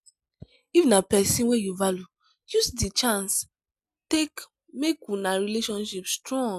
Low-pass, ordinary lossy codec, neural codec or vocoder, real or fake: 14.4 kHz; none; none; real